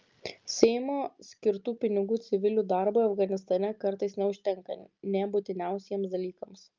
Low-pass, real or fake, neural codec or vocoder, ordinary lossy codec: 7.2 kHz; real; none; Opus, 32 kbps